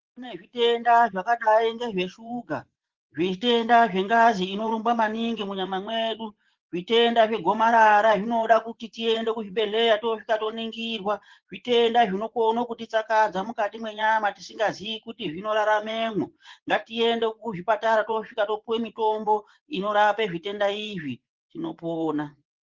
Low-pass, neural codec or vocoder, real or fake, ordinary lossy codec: 7.2 kHz; vocoder, 24 kHz, 100 mel bands, Vocos; fake; Opus, 16 kbps